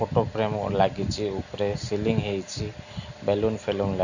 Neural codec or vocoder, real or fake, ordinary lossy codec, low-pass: none; real; none; 7.2 kHz